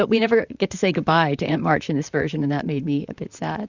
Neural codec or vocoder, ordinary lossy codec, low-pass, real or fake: vocoder, 44.1 kHz, 128 mel bands, Pupu-Vocoder; Opus, 64 kbps; 7.2 kHz; fake